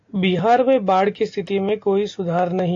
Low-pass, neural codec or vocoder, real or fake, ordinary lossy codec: 7.2 kHz; none; real; AAC, 48 kbps